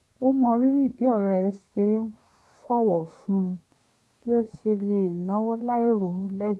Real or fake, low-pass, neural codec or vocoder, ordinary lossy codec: fake; none; codec, 24 kHz, 1 kbps, SNAC; none